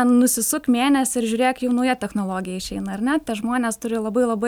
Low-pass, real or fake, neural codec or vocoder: 19.8 kHz; real; none